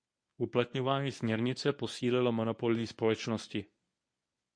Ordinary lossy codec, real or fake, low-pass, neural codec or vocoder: MP3, 48 kbps; fake; 9.9 kHz; codec, 24 kHz, 0.9 kbps, WavTokenizer, medium speech release version 1